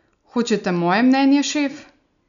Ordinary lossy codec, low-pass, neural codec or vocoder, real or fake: none; 7.2 kHz; none; real